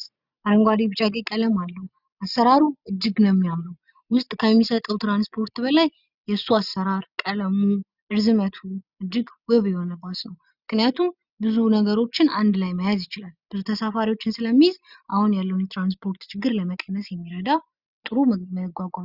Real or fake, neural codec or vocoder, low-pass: real; none; 5.4 kHz